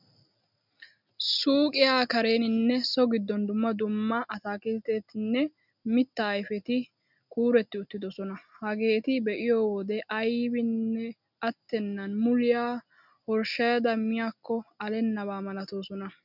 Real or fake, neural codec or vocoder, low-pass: real; none; 5.4 kHz